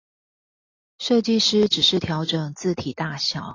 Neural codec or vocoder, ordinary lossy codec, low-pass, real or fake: none; AAC, 32 kbps; 7.2 kHz; real